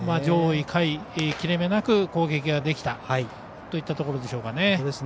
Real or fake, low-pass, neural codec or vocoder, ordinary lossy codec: real; none; none; none